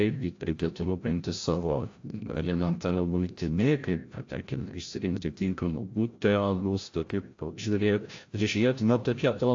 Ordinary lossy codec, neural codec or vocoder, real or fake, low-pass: AAC, 48 kbps; codec, 16 kHz, 0.5 kbps, FreqCodec, larger model; fake; 7.2 kHz